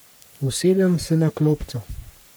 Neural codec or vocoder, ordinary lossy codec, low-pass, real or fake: codec, 44.1 kHz, 3.4 kbps, Pupu-Codec; none; none; fake